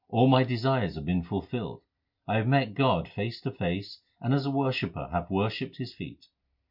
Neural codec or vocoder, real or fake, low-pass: none; real; 5.4 kHz